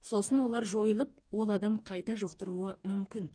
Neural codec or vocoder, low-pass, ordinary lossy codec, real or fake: codec, 44.1 kHz, 2.6 kbps, DAC; 9.9 kHz; none; fake